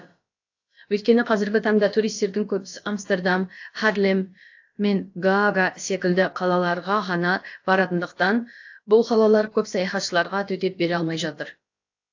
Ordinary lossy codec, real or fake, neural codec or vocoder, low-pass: AAC, 48 kbps; fake; codec, 16 kHz, about 1 kbps, DyCAST, with the encoder's durations; 7.2 kHz